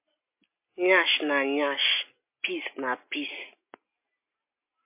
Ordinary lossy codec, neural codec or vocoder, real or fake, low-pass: MP3, 24 kbps; none; real; 3.6 kHz